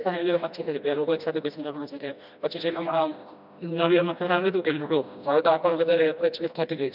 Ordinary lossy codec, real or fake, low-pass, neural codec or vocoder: none; fake; 5.4 kHz; codec, 16 kHz, 1 kbps, FreqCodec, smaller model